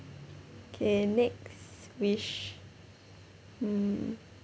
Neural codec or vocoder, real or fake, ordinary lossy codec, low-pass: none; real; none; none